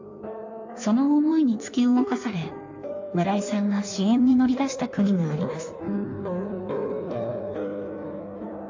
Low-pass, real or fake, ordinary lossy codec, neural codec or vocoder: 7.2 kHz; fake; AAC, 48 kbps; codec, 16 kHz in and 24 kHz out, 1.1 kbps, FireRedTTS-2 codec